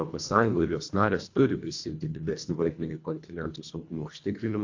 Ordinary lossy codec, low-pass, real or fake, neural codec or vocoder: AAC, 48 kbps; 7.2 kHz; fake; codec, 24 kHz, 1.5 kbps, HILCodec